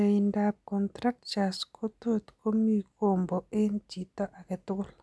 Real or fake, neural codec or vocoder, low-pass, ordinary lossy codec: real; none; none; none